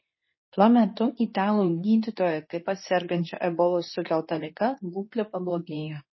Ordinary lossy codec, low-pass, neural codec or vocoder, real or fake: MP3, 24 kbps; 7.2 kHz; codec, 24 kHz, 0.9 kbps, WavTokenizer, medium speech release version 2; fake